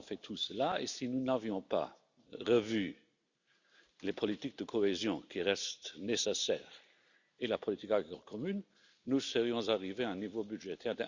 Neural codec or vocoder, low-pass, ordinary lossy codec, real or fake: none; 7.2 kHz; Opus, 64 kbps; real